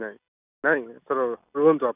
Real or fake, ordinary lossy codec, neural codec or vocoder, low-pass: real; none; none; 3.6 kHz